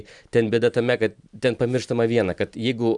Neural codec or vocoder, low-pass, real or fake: none; 10.8 kHz; real